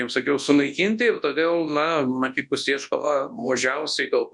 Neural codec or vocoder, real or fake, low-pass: codec, 24 kHz, 0.9 kbps, WavTokenizer, large speech release; fake; 10.8 kHz